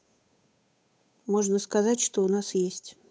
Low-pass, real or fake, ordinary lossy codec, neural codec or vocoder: none; fake; none; codec, 16 kHz, 8 kbps, FunCodec, trained on Chinese and English, 25 frames a second